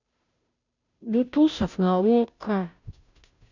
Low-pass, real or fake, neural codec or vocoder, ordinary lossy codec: 7.2 kHz; fake; codec, 16 kHz, 0.5 kbps, FunCodec, trained on Chinese and English, 25 frames a second; none